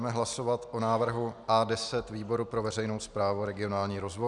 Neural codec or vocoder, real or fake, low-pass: none; real; 10.8 kHz